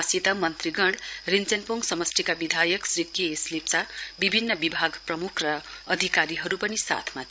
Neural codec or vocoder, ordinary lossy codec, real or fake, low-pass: codec, 16 kHz, 16 kbps, FreqCodec, larger model; none; fake; none